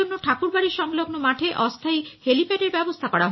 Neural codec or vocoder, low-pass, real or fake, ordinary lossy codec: none; 7.2 kHz; real; MP3, 24 kbps